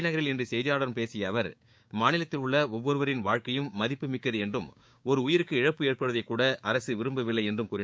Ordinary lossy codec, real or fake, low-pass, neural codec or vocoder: none; fake; none; codec, 16 kHz, 6 kbps, DAC